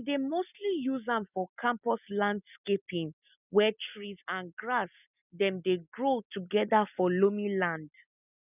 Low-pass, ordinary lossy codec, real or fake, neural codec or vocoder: 3.6 kHz; none; real; none